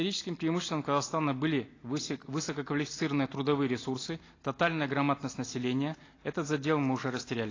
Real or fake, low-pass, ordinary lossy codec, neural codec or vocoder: real; 7.2 kHz; AAC, 32 kbps; none